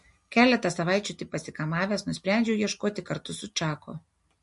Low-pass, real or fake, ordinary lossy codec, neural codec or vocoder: 14.4 kHz; real; MP3, 48 kbps; none